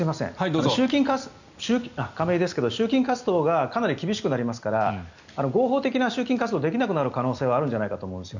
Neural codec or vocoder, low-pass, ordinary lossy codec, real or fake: none; 7.2 kHz; none; real